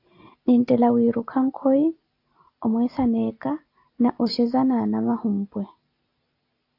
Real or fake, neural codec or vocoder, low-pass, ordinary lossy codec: real; none; 5.4 kHz; AAC, 32 kbps